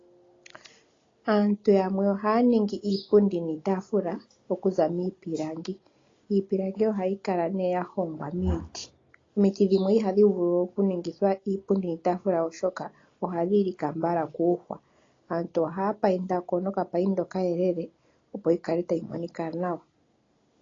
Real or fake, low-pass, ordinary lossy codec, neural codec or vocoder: real; 7.2 kHz; AAC, 32 kbps; none